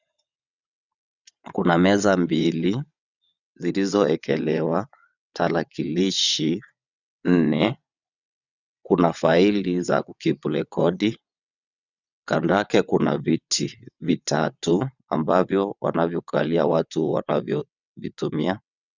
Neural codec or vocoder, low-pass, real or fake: vocoder, 22.05 kHz, 80 mel bands, WaveNeXt; 7.2 kHz; fake